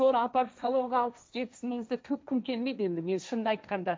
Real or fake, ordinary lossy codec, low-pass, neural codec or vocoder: fake; none; none; codec, 16 kHz, 1.1 kbps, Voila-Tokenizer